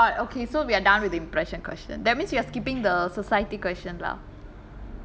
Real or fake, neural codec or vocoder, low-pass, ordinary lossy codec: real; none; none; none